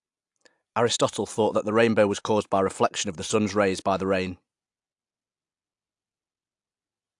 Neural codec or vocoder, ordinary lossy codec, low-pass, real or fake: none; AAC, 64 kbps; 10.8 kHz; real